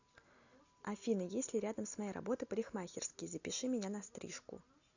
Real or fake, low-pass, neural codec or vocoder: real; 7.2 kHz; none